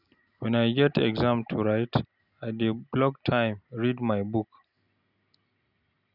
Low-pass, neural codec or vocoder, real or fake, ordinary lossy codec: 5.4 kHz; none; real; none